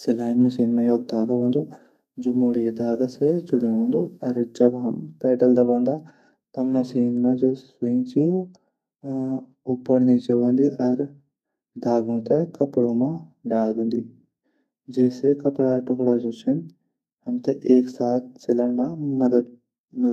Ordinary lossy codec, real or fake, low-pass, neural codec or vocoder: none; fake; 14.4 kHz; codec, 32 kHz, 1.9 kbps, SNAC